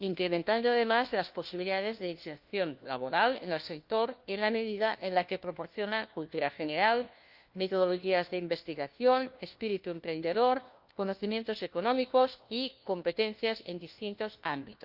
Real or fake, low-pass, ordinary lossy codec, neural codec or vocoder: fake; 5.4 kHz; Opus, 24 kbps; codec, 16 kHz, 1 kbps, FunCodec, trained on LibriTTS, 50 frames a second